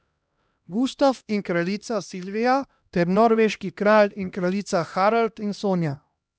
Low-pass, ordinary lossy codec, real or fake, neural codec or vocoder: none; none; fake; codec, 16 kHz, 1 kbps, X-Codec, HuBERT features, trained on LibriSpeech